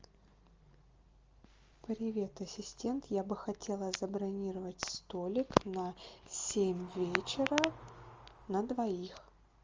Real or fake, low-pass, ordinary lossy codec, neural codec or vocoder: real; 7.2 kHz; Opus, 32 kbps; none